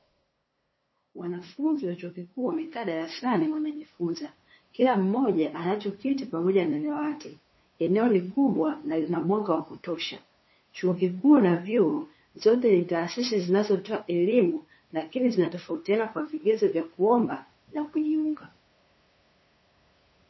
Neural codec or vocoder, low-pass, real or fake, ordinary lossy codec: codec, 16 kHz, 2 kbps, FunCodec, trained on LibriTTS, 25 frames a second; 7.2 kHz; fake; MP3, 24 kbps